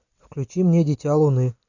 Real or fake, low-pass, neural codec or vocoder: real; 7.2 kHz; none